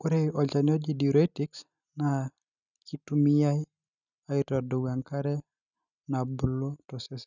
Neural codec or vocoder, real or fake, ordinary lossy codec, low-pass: none; real; none; 7.2 kHz